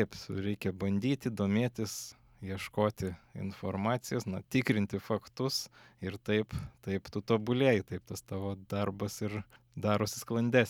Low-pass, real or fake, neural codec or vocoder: 19.8 kHz; real; none